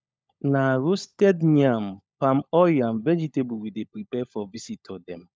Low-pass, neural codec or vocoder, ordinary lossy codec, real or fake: none; codec, 16 kHz, 16 kbps, FunCodec, trained on LibriTTS, 50 frames a second; none; fake